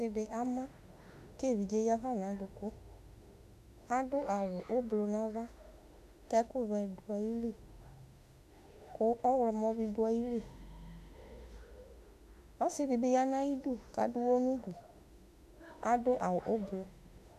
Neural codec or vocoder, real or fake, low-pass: autoencoder, 48 kHz, 32 numbers a frame, DAC-VAE, trained on Japanese speech; fake; 14.4 kHz